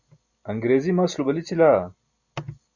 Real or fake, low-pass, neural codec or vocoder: real; 7.2 kHz; none